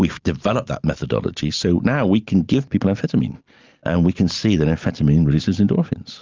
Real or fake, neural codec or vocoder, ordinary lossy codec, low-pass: real; none; Opus, 32 kbps; 7.2 kHz